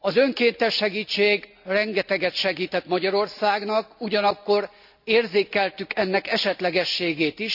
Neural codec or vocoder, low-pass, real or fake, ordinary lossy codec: none; 5.4 kHz; real; none